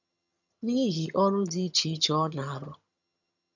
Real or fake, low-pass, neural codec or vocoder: fake; 7.2 kHz; vocoder, 22.05 kHz, 80 mel bands, HiFi-GAN